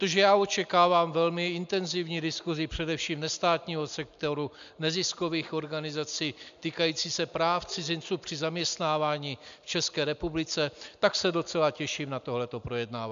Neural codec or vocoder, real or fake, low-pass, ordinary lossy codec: none; real; 7.2 kHz; MP3, 64 kbps